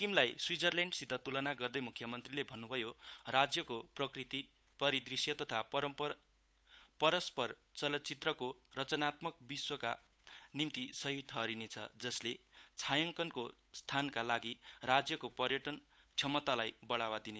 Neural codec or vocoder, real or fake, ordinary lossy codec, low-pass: codec, 16 kHz, 8 kbps, FunCodec, trained on Chinese and English, 25 frames a second; fake; none; none